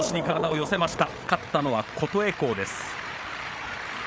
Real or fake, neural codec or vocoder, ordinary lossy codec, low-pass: fake; codec, 16 kHz, 8 kbps, FreqCodec, larger model; none; none